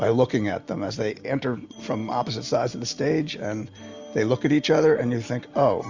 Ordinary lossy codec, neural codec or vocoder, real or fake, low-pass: Opus, 64 kbps; none; real; 7.2 kHz